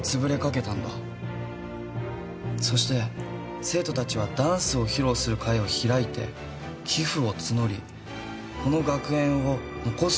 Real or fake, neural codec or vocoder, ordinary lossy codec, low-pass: real; none; none; none